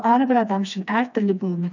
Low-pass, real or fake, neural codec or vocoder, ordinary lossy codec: 7.2 kHz; fake; codec, 16 kHz, 2 kbps, FreqCodec, smaller model; none